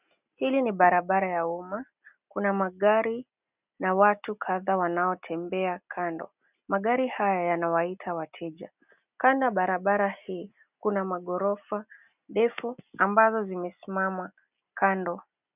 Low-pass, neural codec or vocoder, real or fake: 3.6 kHz; none; real